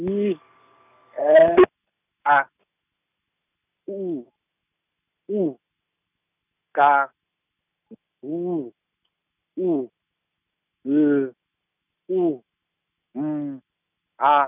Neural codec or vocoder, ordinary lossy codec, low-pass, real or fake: none; none; 3.6 kHz; real